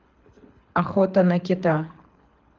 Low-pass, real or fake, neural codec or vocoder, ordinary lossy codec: 7.2 kHz; fake; codec, 24 kHz, 6 kbps, HILCodec; Opus, 32 kbps